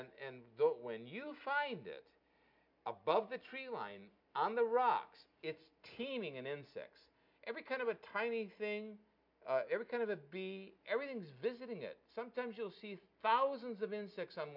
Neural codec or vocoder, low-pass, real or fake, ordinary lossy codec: none; 5.4 kHz; real; MP3, 48 kbps